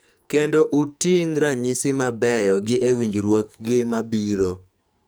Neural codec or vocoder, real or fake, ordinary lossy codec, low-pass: codec, 44.1 kHz, 2.6 kbps, SNAC; fake; none; none